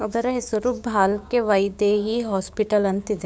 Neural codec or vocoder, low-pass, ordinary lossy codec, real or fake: codec, 16 kHz, 6 kbps, DAC; none; none; fake